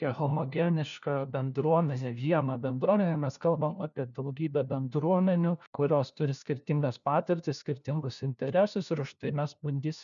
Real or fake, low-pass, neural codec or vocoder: fake; 7.2 kHz; codec, 16 kHz, 1 kbps, FunCodec, trained on LibriTTS, 50 frames a second